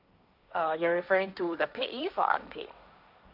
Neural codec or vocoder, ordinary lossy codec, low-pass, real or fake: codec, 16 kHz, 1.1 kbps, Voila-Tokenizer; none; 5.4 kHz; fake